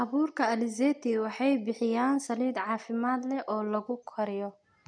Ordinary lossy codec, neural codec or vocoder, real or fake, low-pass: none; none; real; 9.9 kHz